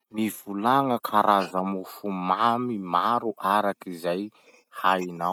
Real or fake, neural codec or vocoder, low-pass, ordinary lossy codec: real; none; 19.8 kHz; none